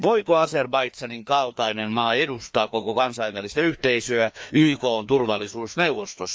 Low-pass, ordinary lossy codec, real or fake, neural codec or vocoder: none; none; fake; codec, 16 kHz, 2 kbps, FreqCodec, larger model